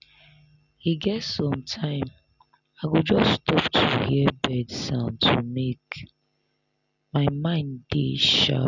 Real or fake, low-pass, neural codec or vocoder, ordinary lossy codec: real; 7.2 kHz; none; none